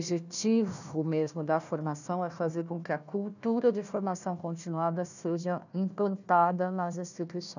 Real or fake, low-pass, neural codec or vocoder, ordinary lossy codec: fake; 7.2 kHz; codec, 16 kHz, 1 kbps, FunCodec, trained on Chinese and English, 50 frames a second; none